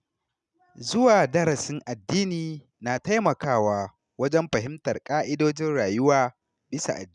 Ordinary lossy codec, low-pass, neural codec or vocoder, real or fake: none; 10.8 kHz; none; real